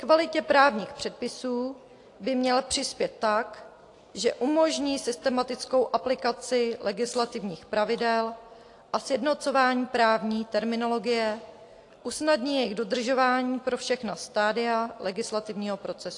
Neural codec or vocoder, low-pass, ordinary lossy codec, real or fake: none; 10.8 kHz; AAC, 48 kbps; real